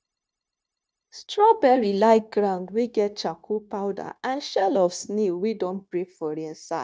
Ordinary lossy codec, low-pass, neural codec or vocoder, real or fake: none; none; codec, 16 kHz, 0.9 kbps, LongCat-Audio-Codec; fake